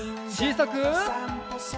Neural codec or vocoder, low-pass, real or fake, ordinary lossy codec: none; none; real; none